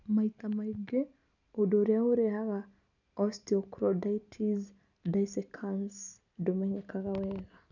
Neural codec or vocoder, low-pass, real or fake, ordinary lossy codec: none; 7.2 kHz; real; none